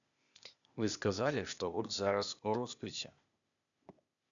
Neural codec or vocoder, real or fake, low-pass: codec, 16 kHz, 0.8 kbps, ZipCodec; fake; 7.2 kHz